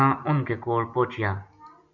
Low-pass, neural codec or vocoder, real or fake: 7.2 kHz; none; real